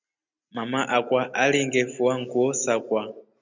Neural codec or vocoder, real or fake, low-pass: none; real; 7.2 kHz